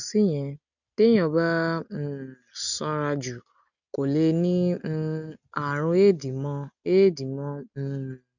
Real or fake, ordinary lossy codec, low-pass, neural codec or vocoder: real; none; 7.2 kHz; none